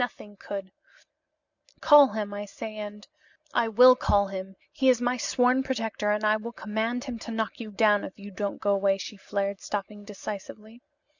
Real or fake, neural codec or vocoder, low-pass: real; none; 7.2 kHz